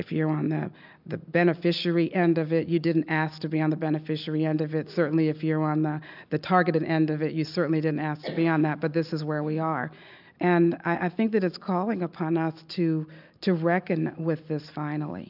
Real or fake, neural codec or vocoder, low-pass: real; none; 5.4 kHz